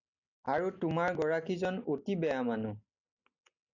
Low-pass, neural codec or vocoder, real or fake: 7.2 kHz; none; real